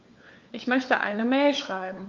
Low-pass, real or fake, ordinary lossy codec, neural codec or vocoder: 7.2 kHz; fake; Opus, 24 kbps; codec, 16 kHz, 4 kbps, FunCodec, trained on LibriTTS, 50 frames a second